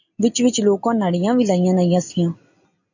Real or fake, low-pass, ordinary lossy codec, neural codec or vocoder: real; 7.2 kHz; AAC, 48 kbps; none